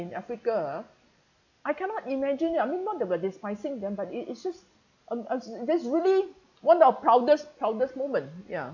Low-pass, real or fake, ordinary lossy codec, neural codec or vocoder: 7.2 kHz; real; none; none